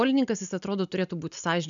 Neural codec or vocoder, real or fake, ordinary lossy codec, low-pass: none; real; MP3, 96 kbps; 7.2 kHz